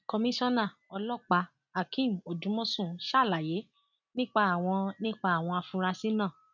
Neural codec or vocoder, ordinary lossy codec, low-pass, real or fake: none; none; 7.2 kHz; real